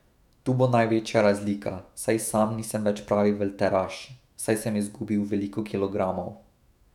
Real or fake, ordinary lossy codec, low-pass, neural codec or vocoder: fake; none; 19.8 kHz; autoencoder, 48 kHz, 128 numbers a frame, DAC-VAE, trained on Japanese speech